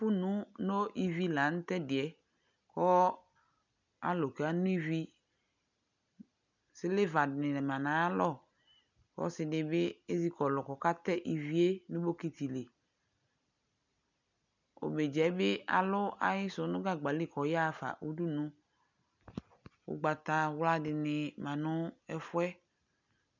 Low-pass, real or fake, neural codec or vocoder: 7.2 kHz; real; none